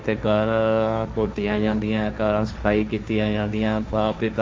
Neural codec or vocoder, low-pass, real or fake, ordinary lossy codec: codec, 16 kHz, 1.1 kbps, Voila-Tokenizer; none; fake; none